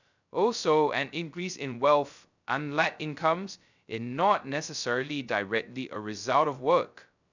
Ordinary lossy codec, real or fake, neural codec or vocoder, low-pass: none; fake; codec, 16 kHz, 0.2 kbps, FocalCodec; 7.2 kHz